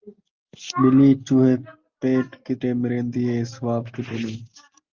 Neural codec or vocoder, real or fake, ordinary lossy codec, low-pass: none; real; Opus, 24 kbps; 7.2 kHz